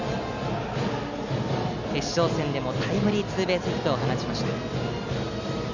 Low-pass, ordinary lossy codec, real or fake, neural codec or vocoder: 7.2 kHz; none; real; none